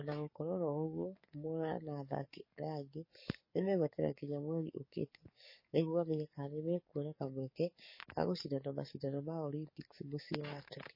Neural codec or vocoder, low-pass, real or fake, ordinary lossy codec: codec, 44.1 kHz, 7.8 kbps, DAC; 5.4 kHz; fake; MP3, 24 kbps